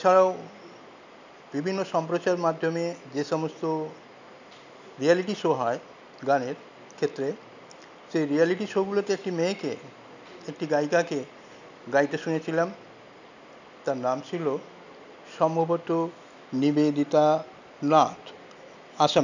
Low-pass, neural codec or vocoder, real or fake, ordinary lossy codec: 7.2 kHz; none; real; none